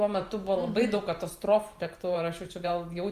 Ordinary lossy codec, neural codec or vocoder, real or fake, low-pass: Opus, 32 kbps; vocoder, 44.1 kHz, 128 mel bands every 512 samples, BigVGAN v2; fake; 14.4 kHz